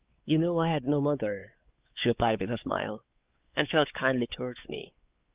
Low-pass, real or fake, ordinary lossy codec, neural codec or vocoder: 3.6 kHz; fake; Opus, 32 kbps; codec, 16 kHz, 4 kbps, X-Codec, WavLM features, trained on Multilingual LibriSpeech